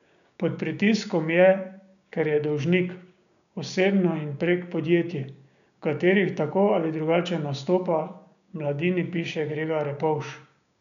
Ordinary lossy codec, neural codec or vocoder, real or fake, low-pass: none; codec, 16 kHz, 6 kbps, DAC; fake; 7.2 kHz